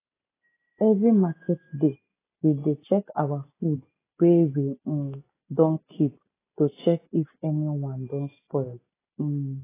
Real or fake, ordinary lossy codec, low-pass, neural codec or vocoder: real; AAC, 16 kbps; 3.6 kHz; none